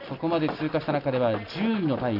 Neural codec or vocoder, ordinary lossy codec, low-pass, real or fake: none; none; 5.4 kHz; real